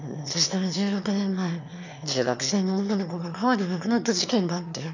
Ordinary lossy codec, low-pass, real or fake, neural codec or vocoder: none; 7.2 kHz; fake; autoencoder, 22.05 kHz, a latent of 192 numbers a frame, VITS, trained on one speaker